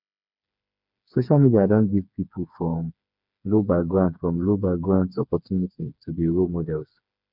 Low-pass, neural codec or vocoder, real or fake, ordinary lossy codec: 5.4 kHz; codec, 16 kHz, 4 kbps, FreqCodec, smaller model; fake; none